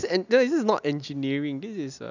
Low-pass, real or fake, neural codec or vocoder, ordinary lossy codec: 7.2 kHz; real; none; none